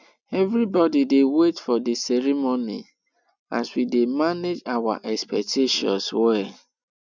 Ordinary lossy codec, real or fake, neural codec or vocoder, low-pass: none; real; none; 7.2 kHz